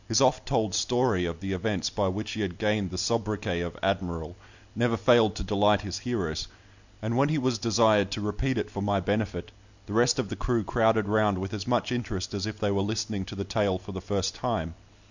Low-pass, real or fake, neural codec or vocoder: 7.2 kHz; real; none